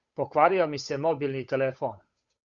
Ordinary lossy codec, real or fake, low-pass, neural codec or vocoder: Opus, 64 kbps; fake; 7.2 kHz; codec, 16 kHz, 8 kbps, FunCodec, trained on Chinese and English, 25 frames a second